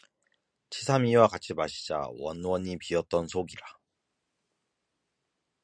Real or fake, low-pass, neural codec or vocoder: real; 9.9 kHz; none